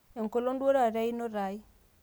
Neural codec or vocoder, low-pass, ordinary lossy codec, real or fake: none; none; none; real